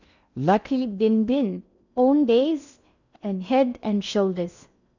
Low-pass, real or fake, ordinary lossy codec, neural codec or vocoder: 7.2 kHz; fake; none; codec, 16 kHz in and 24 kHz out, 0.6 kbps, FocalCodec, streaming, 2048 codes